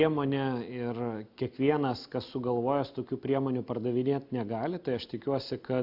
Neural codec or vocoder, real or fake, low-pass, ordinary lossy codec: none; real; 5.4 kHz; AAC, 48 kbps